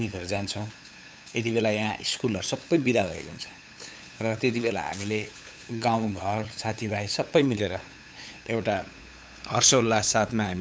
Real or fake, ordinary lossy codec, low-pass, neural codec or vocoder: fake; none; none; codec, 16 kHz, 8 kbps, FunCodec, trained on LibriTTS, 25 frames a second